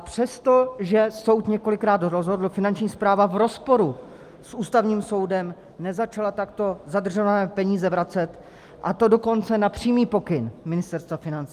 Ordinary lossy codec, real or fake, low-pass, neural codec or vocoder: Opus, 32 kbps; real; 14.4 kHz; none